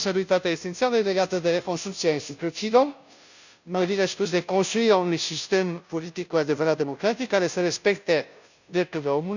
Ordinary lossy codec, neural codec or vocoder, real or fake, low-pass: none; codec, 16 kHz, 0.5 kbps, FunCodec, trained on Chinese and English, 25 frames a second; fake; 7.2 kHz